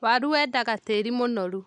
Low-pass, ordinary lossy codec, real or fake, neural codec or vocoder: 10.8 kHz; none; real; none